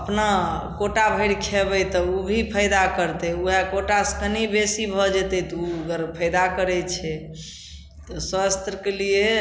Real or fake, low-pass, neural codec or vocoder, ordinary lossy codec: real; none; none; none